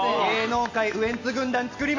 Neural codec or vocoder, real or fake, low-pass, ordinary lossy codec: none; real; 7.2 kHz; AAC, 48 kbps